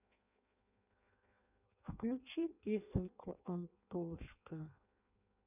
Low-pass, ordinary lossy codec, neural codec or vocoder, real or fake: 3.6 kHz; none; codec, 16 kHz in and 24 kHz out, 0.6 kbps, FireRedTTS-2 codec; fake